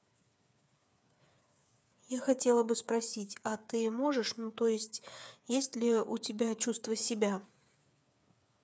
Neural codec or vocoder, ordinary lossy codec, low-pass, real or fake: codec, 16 kHz, 8 kbps, FreqCodec, smaller model; none; none; fake